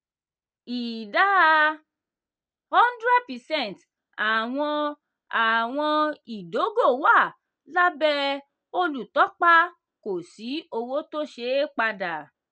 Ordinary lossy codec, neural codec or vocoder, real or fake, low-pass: none; none; real; none